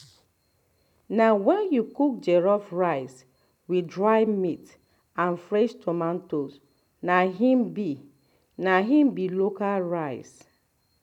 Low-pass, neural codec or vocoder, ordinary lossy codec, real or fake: 19.8 kHz; none; none; real